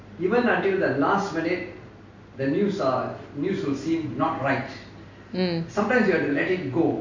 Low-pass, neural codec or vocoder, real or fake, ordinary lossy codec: 7.2 kHz; none; real; AAC, 48 kbps